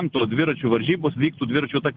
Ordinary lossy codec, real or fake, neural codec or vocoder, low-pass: Opus, 32 kbps; real; none; 7.2 kHz